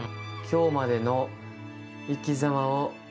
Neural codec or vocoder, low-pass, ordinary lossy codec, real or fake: none; none; none; real